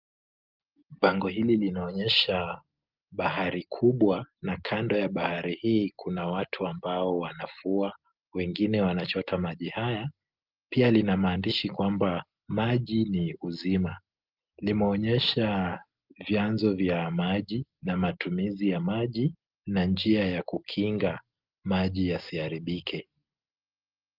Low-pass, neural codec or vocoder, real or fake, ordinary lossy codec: 5.4 kHz; none; real; Opus, 32 kbps